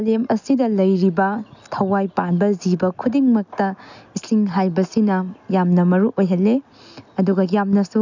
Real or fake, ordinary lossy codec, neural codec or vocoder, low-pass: real; none; none; 7.2 kHz